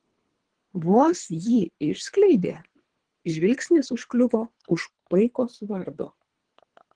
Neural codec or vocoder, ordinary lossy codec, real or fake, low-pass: codec, 24 kHz, 3 kbps, HILCodec; Opus, 16 kbps; fake; 9.9 kHz